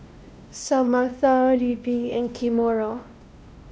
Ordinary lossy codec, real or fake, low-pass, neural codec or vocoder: none; fake; none; codec, 16 kHz, 1 kbps, X-Codec, WavLM features, trained on Multilingual LibriSpeech